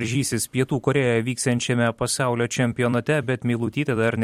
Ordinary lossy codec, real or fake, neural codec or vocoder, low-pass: MP3, 64 kbps; fake; vocoder, 44.1 kHz, 128 mel bands every 256 samples, BigVGAN v2; 19.8 kHz